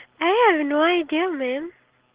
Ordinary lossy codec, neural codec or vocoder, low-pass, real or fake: Opus, 16 kbps; none; 3.6 kHz; real